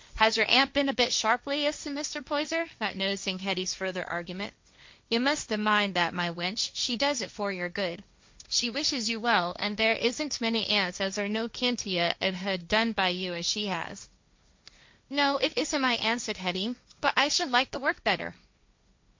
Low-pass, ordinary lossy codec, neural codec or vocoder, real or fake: 7.2 kHz; MP3, 48 kbps; codec, 16 kHz, 1.1 kbps, Voila-Tokenizer; fake